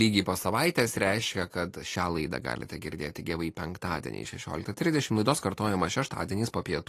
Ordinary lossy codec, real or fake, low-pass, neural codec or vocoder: AAC, 48 kbps; real; 14.4 kHz; none